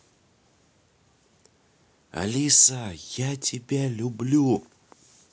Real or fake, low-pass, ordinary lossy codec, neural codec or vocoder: real; none; none; none